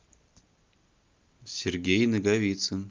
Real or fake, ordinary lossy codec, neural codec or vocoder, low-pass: real; Opus, 32 kbps; none; 7.2 kHz